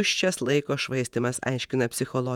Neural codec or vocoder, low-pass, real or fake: vocoder, 48 kHz, 128 mel bands, Vocos; 19.8 kHz; fake